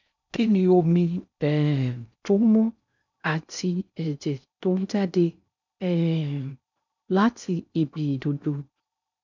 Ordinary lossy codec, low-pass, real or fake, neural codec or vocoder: none; 7.2 kHz; fake; codec, 16 kHz in and 24 kHz out, 0.6 kbps, FocalCodec, streaming, 4096 codes